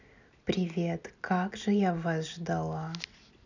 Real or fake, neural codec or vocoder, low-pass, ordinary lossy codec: real; none; 7.2 kHz; none